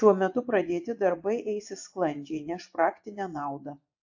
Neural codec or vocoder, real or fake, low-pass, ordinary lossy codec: vocoder, 24 kHz, 100 mel bands, Vocos; fake; 7.2 kHz; AAC, 48 kbps